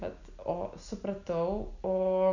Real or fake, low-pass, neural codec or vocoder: real; 7.2 kHz; none